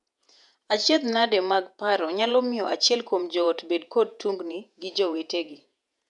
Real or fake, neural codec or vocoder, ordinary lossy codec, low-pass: fake; vocoder, 48 kHz, 128 mel bands, Vocos; none; 10.8 kHz